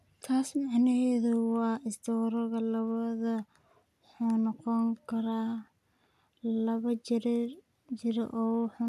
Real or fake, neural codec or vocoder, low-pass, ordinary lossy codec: real; none; 14.4 kHz; AAC, 96 kbps